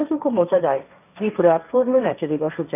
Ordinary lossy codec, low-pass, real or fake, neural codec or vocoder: none; 3.6 kHz; fake; codec, 16 kHz, 1.1 kbps, Voila-Tokenizer